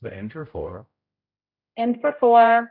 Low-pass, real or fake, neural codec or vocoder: 5.4 kHz; fake; codec, 16 kHz, 0.5 kbps, X-Codec, HuBERT features, trained on general audio